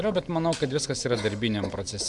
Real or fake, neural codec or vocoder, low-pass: real; none; 10.8 kHz